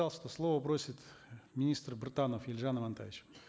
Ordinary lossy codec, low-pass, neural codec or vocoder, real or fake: none; none; none; real